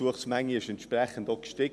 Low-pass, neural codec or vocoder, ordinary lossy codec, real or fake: none; none; none; real